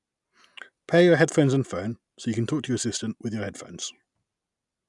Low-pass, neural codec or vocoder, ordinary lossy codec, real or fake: 10.8 kHz; none; none; real